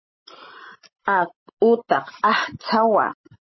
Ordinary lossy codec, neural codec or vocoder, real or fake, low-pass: MP3, 24 kbps; none; real; 7.2 kHz